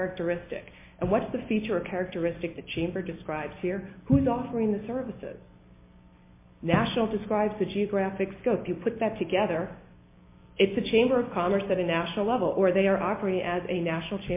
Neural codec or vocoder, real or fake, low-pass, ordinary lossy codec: none; real; 3.6 kHz; MP3, 24 kbps